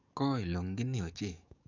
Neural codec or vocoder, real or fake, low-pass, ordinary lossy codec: codec, 44.1 kHz, 7.8 kbps, DAC; fake; 7.2 kHz; none